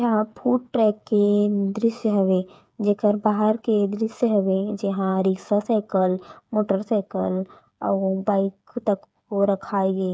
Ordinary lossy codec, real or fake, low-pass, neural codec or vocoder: none; fake; none; codec, 16 kHz, 8 kbps, FreqCodec, smaller model